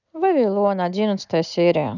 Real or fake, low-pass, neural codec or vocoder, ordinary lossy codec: real; 7.2 kHz; none; none